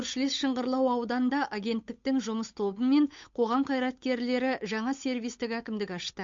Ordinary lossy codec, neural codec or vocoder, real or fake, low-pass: MP3, 48 kbps; codec, 16 kHz, 8 kbps, FunCodec, trained on Chinese and English, 25 frames a second; fake; 7.2 kHz